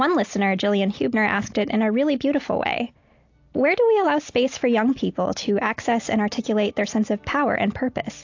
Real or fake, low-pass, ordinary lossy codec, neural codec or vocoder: real; 7.2 kHz; AAC, 48 kbps; none